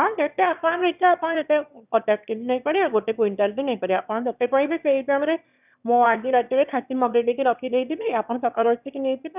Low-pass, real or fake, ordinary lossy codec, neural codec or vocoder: 3.6 kHz; fake; none; autoencoder, 22.05 kHz, a latent of 192 numbers a frame, VITS, trained on one speaker